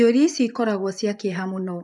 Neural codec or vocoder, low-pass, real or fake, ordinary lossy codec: vocoder, 24 kHz, 100 mel bands, Vocos; 10.8 kHz; fake; none